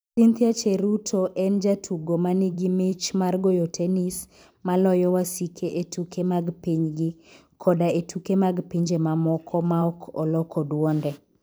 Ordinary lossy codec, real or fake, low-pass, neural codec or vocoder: none; fake; none; vocoder, 44.1 kHz, 128 mel bands every 512 samples, BigVGAN v2